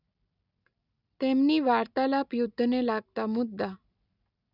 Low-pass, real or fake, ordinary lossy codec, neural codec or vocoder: 5.4 kHz; real; none; none